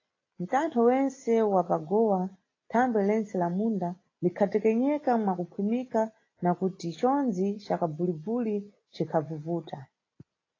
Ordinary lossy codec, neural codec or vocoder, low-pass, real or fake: AAC, 32 kbps; none; 7.2 kHz; real